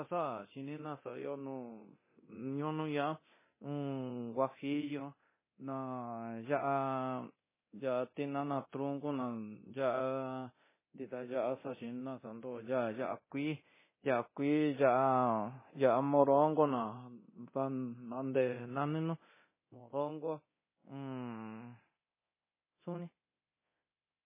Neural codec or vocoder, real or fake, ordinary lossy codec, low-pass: codec, 24 kHz, 0.9 kbps, DualCodec; fake; MP3, 16 kbps; 3.6 kHz